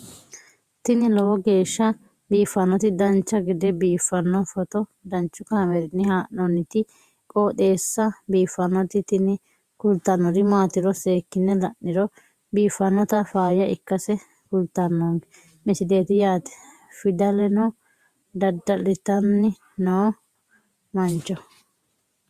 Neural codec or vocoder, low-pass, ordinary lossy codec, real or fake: vocoder, 48 kHz, 128 mel bands, Vocos; 14.4 kHz; Opus, 64 kbps; fake